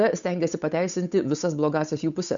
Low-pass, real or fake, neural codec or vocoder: 7.2 kHz; fake; codec, 16 kHz, 8 kbps, FunCodec, trained on Chinese and English, 25 frames a second